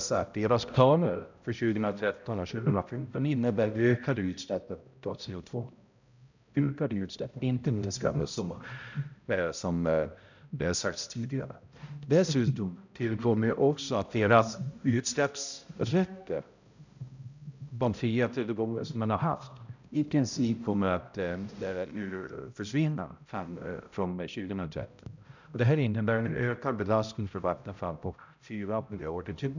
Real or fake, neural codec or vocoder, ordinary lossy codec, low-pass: fake; codec, 16 kHz, 0.5 kbps, X-Codec, HuBERT features, trained on balanced general audio; none; 7.2 kHz